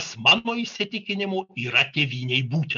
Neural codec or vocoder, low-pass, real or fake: none; 7.2 kHz; real